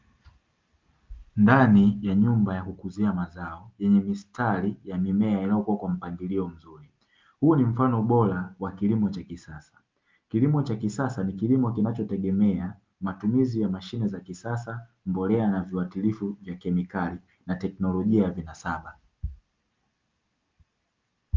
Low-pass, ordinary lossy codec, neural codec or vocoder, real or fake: 7.2 kHz; Opus, 24 kbps; none; real